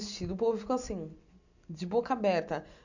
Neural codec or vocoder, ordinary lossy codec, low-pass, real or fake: none; none; 7.2 kHz; real